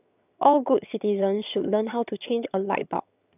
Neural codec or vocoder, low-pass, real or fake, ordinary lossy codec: vocoder, 22.05 kHz, 80 mel bands, HiFi-GAN; 3.6 kHz; fake; none